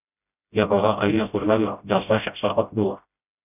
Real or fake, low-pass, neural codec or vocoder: fake; 3.6 kHz; codec, 16 kHz, 0.5 kbps, FreqCodec, smaller model